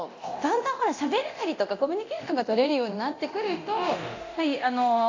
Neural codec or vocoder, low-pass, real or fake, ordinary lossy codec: codec, 24 kHz, 0.9 kbps, DualCodec; 7.2 kHz; fake; AAC, 48 kbps